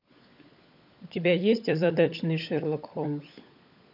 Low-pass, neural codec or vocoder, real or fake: 5.4 kHz; codec, 16 kHz, 16 kbps, FunCodec, trained on LibriTTS, 50 frames a second; fake